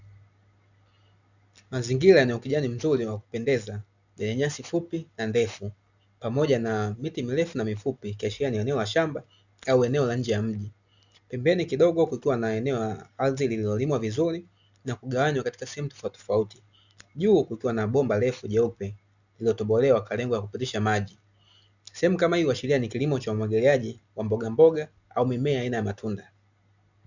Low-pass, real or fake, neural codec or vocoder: 7.2 kHz; real; none